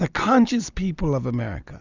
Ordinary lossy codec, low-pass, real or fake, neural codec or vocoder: Opus, 64 kbps; 7.2 kHz; real; none